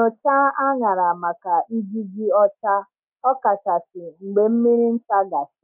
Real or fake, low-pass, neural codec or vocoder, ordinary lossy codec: real; 3.6 kHz; none; MP3, 32 kbps